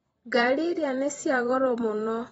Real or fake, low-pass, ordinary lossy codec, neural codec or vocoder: fake; 19.8 kHz; AAC, 24 kbps; vocoder, 44.1 kHz, 128 mel bands every 512 samples, BigVGAN v2